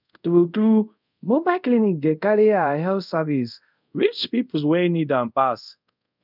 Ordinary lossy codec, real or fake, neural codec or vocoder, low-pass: none; fake; codec, 24 kHz, 0.5 kbps, DualCodec; 5.4 kHz